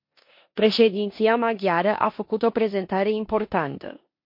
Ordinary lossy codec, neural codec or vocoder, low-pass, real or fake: MP3, 32 kbps; codec, 16 kHz in and 24 kHz out, 0.9 kbps, LongCat-Audio-Codec, four codebook decoder; 5.4 kHz; fake